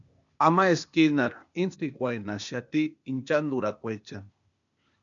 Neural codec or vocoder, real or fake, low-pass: codec, 16 kHz, 0.8 kbps, ZipCodec; fake; 7.2 kHz